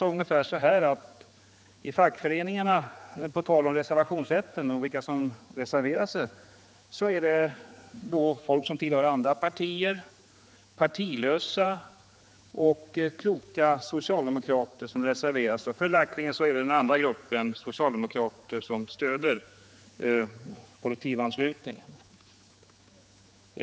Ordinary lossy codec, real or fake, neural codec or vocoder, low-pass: none; fake; codec, 16 kHz, 4 kbps, X-Codec, HuBERT features, trained on general audio; none